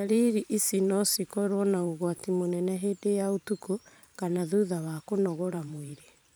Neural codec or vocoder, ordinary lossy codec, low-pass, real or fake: vocoder, 44.1 kHz, 128 mel bands every 512 samples, BigVGAN v2; none; none; fake